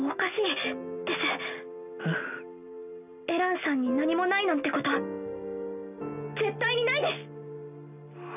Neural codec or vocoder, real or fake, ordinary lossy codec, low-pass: none; real; none; 3.6 kHz